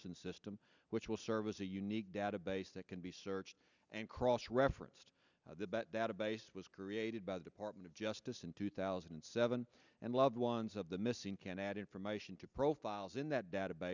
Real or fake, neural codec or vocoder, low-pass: real; none; 7.2 kHz